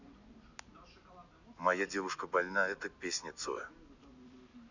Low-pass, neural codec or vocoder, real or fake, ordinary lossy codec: 7.2 kHz; none; real; none